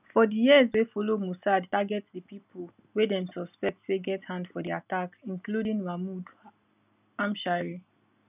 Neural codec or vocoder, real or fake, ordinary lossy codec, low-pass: none; real; none; 3.6 kHz